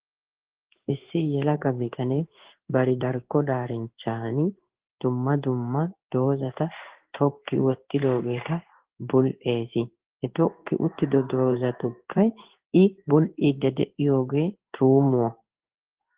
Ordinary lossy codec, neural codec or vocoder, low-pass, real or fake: Opus, 16 kbps; codec, 16 kHz in and 24 kHz out, 1 kbps, XY-Tokenizer; 3.6 kHz; fake